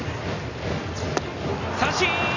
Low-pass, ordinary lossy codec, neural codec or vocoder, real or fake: 7.2 kHz; none; none; real